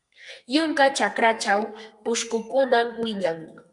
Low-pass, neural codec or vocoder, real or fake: 10.8 kHz; codec, 32 kHz, 1.9 kbps, SNAC; fake